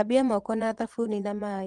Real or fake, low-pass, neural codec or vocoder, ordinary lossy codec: fake; 9.9 kHz; vocoder, 22.05 kHz, 80 mel bands, Vocos; Opus, 24 kbps